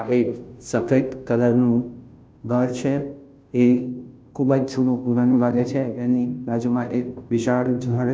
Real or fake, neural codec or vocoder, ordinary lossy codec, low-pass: fake; codec, 16 kHz, 0.5 kbps, FunCodec, trained on Chinese and English, 25 frames a second; none; none